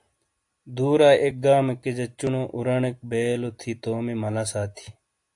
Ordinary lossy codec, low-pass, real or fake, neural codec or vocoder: AAC, 64 kbps; 10.8 kHz; real; none